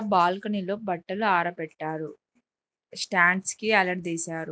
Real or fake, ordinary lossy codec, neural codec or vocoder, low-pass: real; none; none; none